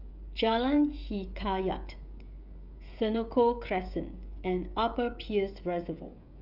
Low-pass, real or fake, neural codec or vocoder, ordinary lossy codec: 5.4 kHz; fake; codec, 16 kHz, 16 kbps, FreqCodec, smaller model; none